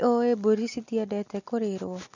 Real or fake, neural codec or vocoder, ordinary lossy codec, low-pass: real; none; none; 7.2 kHz